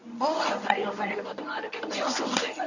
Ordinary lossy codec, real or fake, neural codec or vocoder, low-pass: none; fake; codec, 24 kHz, 0.9 kbps, WavTokenizer, medium speech release version 1; 7.2 kHz